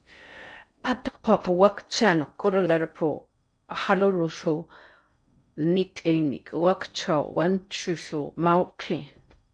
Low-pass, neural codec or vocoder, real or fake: 9.9 kHz; codec, 16 kHz in and 24 kHz out, 0.6 kbps, FocalCodec, streaming, 4096 codes; fake